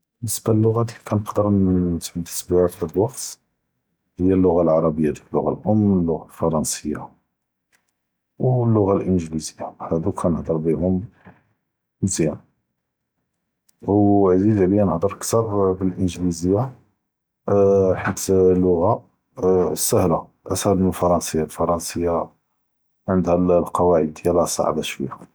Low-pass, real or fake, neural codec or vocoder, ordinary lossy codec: none; real; none; none